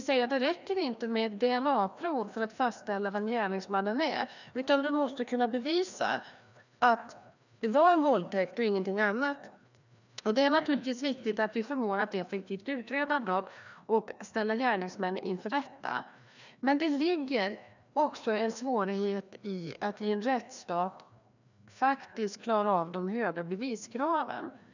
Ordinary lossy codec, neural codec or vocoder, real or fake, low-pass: none; codec, 16 kHz, 1 kbps, FreqCodec, larger model; fake; 7.2 kHz